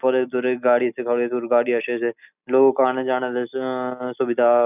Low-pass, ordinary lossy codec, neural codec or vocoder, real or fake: 3.6 kHz; none; none; real